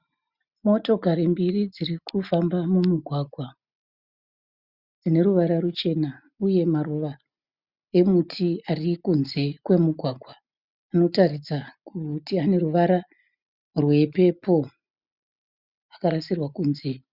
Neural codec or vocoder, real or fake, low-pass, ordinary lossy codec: none; real; 5.4 kHz; Opus, 64 kbps